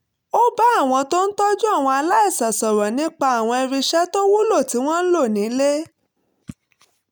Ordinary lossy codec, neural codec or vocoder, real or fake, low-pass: none; none; real; none